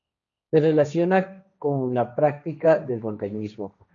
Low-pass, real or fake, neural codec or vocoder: 7.2 kHz; fake; codec, 16 kHz, 1.1 kbps, Voila-Tokenizer